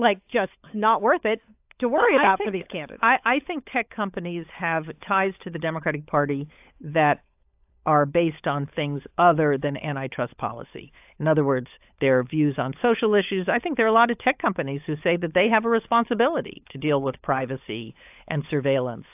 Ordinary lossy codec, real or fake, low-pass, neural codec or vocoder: AAC, 32 kbps; fake; 3.6 kHz; codec, 16 kHz, 16 kbps, FunCodec, trained on LibriTTS, 50 frames a second